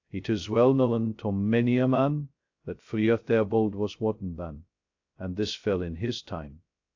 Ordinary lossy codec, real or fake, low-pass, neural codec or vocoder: AAC, 48 kbps; fake; 7.2 kHz; codec, 16 kHz, 0.2 kbps, FocalCodec